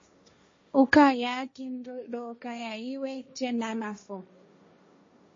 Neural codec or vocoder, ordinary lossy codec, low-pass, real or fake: codec, 16 kHz, 1.1 kbps, Voila-Tokenizer; MP3, 32 kbps; 7.2 kHz; fake